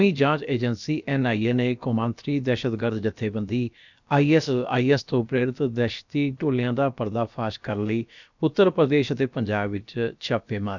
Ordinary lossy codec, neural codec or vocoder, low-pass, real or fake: none; codec, 16 kHz, about 1 kbps, DyCAST, with the encoder's durations; 7.2 kHz; fake